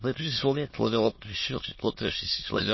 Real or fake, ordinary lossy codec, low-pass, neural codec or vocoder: fake; MP3, 24 kbps; 7.2 kHz; autoencoder, 22.05 kHz, a latent of 192 numbers a frame, VITS, trained on many speakers